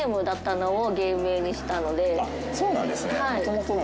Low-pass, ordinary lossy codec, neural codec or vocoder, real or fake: none; none; none; real